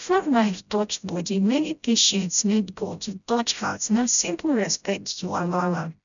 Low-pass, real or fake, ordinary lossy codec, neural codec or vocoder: 7.2 kHz; fake; none; codec, 16 kHz, 0.5 kbps, FreqCodec, smaller model